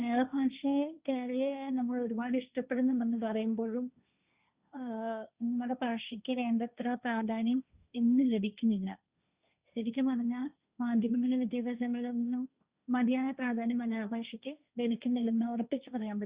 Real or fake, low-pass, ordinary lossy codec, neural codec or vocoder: fake; 3.6 kHz; Opus, 64 kbps; codec, 16 kHz, 1.1 kbps, Voila-Tokenizer